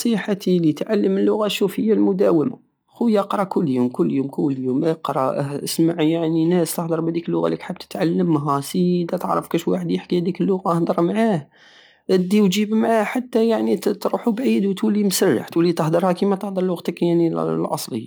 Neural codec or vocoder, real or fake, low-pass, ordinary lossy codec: none; real; none; none